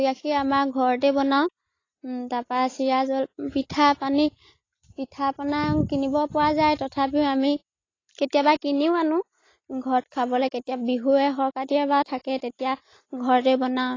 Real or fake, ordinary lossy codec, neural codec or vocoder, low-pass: real; AAC, 32 kbps; none; 7.2 kHz